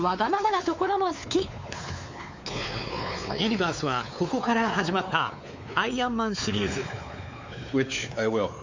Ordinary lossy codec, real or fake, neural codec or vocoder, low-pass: MP3, 64 kbps; fake; codec, 16 kHz, 4 kbps, X-Codec, WavLM features, trained on Multilingual LibriSpeech; 7.2 kHz